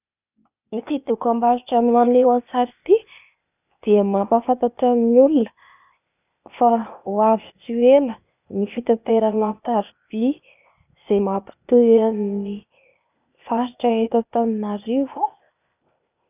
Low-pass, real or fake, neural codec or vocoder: 3.6 kHz; fake; codec, 16 kHz, 0.8 kbps, ZipCodec